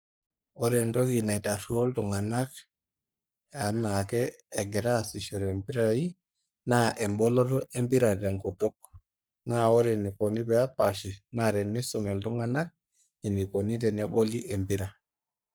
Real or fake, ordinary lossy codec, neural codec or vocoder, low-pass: fake; none; codec, 44.1 kHz, 3.4 kbps, Pupu-Codec; none